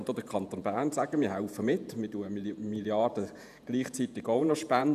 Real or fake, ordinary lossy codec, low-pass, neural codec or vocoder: fake; none; 14.4 kHz; vocoder, 48 kHz, 128 mel bands, Vocos